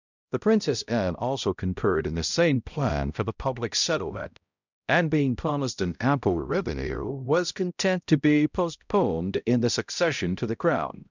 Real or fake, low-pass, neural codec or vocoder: fake; 7.2 kHz; codec, 16 kHz, 0.5 kbps, X-Codec, HuBERT features, trained on balanced general audio